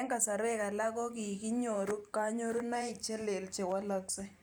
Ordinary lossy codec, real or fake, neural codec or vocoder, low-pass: none; fake; vocoder, 44.1 kHz, 128 mel bands every 512 samples, BigVGAN v2; none